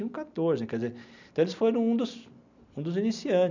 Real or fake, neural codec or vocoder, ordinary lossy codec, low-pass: real; none; none; 7.2 kHz